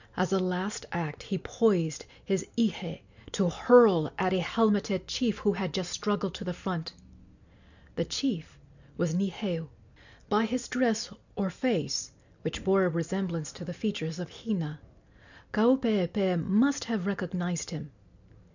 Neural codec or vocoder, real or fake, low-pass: none; real; 7.2 kHz